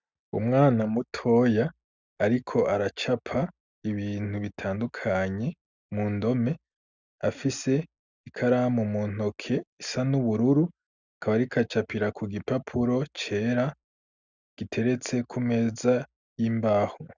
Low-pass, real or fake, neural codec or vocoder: 7.2 kHz; real; none